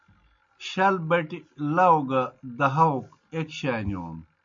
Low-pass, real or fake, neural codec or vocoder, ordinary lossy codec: 7.2 kHz; real; none; MP3, 48 kbps